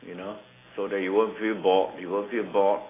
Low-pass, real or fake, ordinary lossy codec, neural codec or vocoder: 3.6 kHz; real; AAC, 16 kbps; none